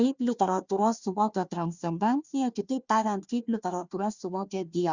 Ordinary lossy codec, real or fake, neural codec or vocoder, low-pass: Opus, 64 kbps; fake; codec, 16 kHz, 1 kbps, FunCodec, trained on Chinese and English, 50 frames a second; 7.2 kHz